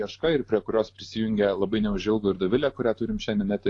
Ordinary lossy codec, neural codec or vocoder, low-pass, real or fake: AAC, 48 kbps; none; 10.8 kHz; real